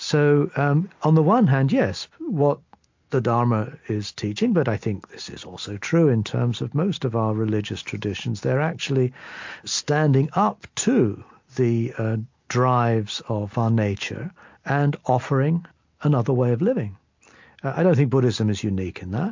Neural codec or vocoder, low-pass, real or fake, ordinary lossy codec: none; 7.2 kHz; real; MP3, 48 kbps